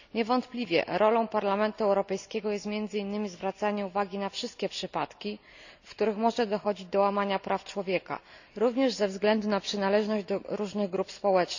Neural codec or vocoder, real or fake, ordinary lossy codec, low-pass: none; real; none; 7.2 kHz